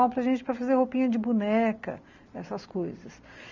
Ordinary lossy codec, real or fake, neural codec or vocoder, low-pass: none; real; none; 7.2 kHz